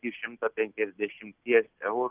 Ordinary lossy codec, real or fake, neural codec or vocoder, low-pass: Opus, 24 kbps; real; none; 3.6 kHz